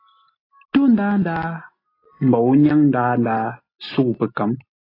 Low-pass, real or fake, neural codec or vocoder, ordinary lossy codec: 5.4 kHz; real; none; AAC, 24 kbps